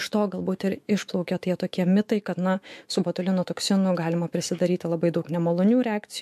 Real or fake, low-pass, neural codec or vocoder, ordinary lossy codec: fake; 14.4 kHz; autoencoder, 48 kHz, 128 numbers a frame, DAC-VAE, trained on Japanese speech; MP3, 64 kbps